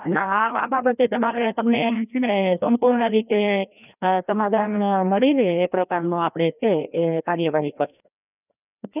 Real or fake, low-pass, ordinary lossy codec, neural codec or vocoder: fake; 3.6 kHz; none; codec, 16 kHz, 1 kbps, FreqCodec, larger model